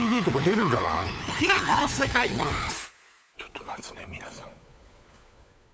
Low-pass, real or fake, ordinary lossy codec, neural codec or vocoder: none; fake; none; codec, 16 kHz, 4 kbps, FunCodec, trained on LibriTTS, 50 frames a second